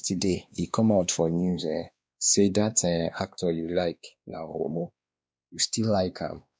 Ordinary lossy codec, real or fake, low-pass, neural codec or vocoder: none; fake; none; codec, 16 kHz, 2 kbps, X-Codec, WavLM features, trained on Multilingual LibriSpeech